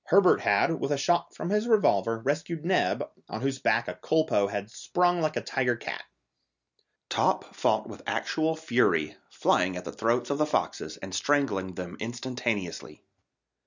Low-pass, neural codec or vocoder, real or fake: 7.2 kHz; none; real